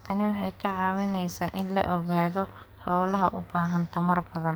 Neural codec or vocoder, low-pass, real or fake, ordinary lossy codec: codec, 44.1 kHz, 2.6 kbps, SNAC; none; fake; none